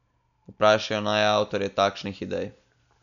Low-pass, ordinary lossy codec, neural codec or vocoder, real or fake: 7.2 kHz; none; none; real